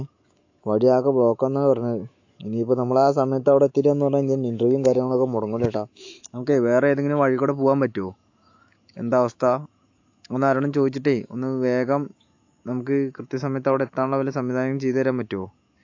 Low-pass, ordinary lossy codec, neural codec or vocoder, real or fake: 7.2 kHz; AAC, 48 kbps; autoencoder, 48 kHz, 128 numbers a frame, DAC-VAE, trained on Japanese speech; fake